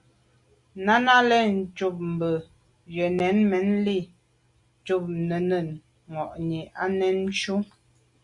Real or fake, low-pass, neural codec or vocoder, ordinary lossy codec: real; 10.8 kHz; none; Opus, 64 kbps